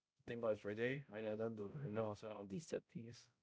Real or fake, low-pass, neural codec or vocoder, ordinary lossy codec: fake; none; codec, 16 kHz, 0.5 kbps, X-Codec, HuBERT features, trained on balanced general audio; none